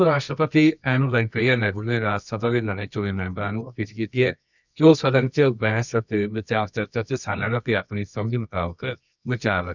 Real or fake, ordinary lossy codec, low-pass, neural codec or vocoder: fake; none; 7.2 kHz; codec, 24 kHz, 0.9 kbps, WavTokenizer, medium music audio release